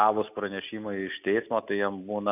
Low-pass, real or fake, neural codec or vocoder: 3.6 kHz; real; none